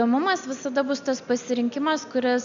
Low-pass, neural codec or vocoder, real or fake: 7.2 kHz; none; real